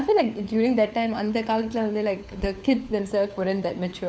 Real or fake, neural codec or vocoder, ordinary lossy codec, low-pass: fake; codec, 16 kHz, 4 kbps, FunCodec, trained on LibriTTS, 50 frames a second; none; none